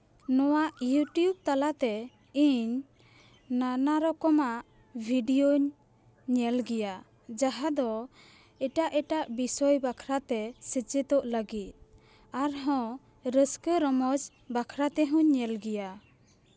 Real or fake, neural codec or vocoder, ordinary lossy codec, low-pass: real; none; none; none